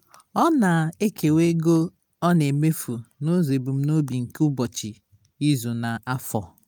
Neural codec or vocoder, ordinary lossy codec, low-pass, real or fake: none; none; none; real